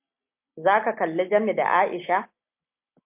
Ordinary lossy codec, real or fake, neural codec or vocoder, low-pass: MP3, 32 kbps; real; none; 3.6 kHz